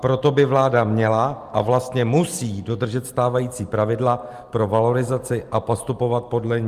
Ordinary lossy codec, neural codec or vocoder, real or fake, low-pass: Opus, 32 kbps; none; real; 14.4 kHz